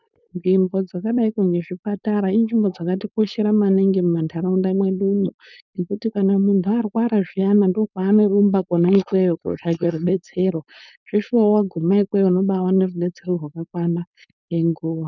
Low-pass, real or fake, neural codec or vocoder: 7.2 kHz; fake; codec, 16 kHz, 4.8 kbps, FACodec